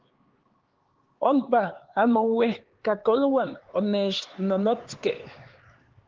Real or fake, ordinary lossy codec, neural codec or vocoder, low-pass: fake; Opus, 16 kbps; codec, 16 kHz, 4 kbps, X-Codec, HuBERT features, trained on LibriSpeech; 7.2 kHz